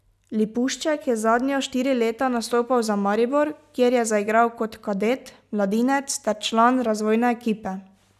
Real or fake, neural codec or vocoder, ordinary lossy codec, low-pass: real; none; none; 14.4 kHz